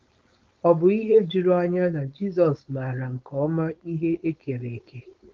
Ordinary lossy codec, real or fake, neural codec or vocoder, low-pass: Opus, 24 kbps; fake; codec, 16 kHz, 4.8 kbps, FACodec; 7.2 kHz